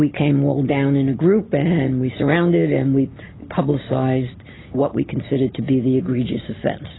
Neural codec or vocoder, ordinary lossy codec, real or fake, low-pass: none; AAC, 16 kbps; real; 7.2 kHz